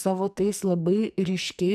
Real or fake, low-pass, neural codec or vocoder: fake; 14.4 kHz; codec, 44.1 kHz, 2.6 kbps, SNAC